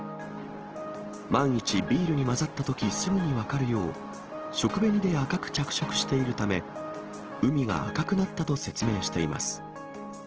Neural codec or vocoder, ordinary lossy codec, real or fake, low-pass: none; Opus, 16 kbps; real; 7.2 kHz